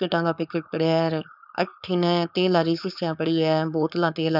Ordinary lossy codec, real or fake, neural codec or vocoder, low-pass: none; fake; codec, 16 kHz, 4.8 kbps, FACodec; 5.4 kHz